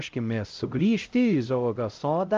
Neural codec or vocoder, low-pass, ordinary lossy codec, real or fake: codec, 16 kHz, 0.5 kbps, X-Codec, HuBERT features, trained on LibriSpeech; 7.2 kHz; Opus, 32 kbps; fake